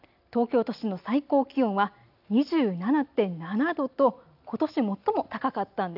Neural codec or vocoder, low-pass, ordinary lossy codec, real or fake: none; 5.4 kHz; AAC, 48 kbps; real